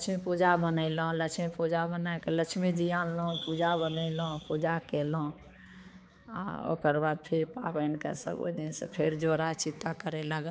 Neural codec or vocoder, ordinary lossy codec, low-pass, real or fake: codec, 16 kHz, 4 kbps, X-Codec, HuBERT features, trained on balanced general audio; none; none; fake